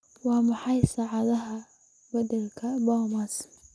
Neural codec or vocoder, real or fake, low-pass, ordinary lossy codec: none; real; none; none